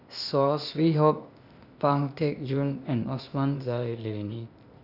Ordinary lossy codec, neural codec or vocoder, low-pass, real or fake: none; codec, 16 kHz, 0.8 kbps, ZipCodec; 5.4 kHz; fake